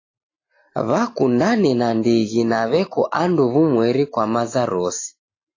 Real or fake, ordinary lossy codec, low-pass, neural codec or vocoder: real; AAC, 32 kbps; 7.2 kHz; none